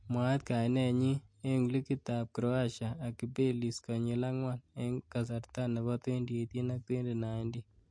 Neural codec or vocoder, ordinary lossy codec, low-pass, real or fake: none; MP3, 48 kbps; 9.9 kHz; real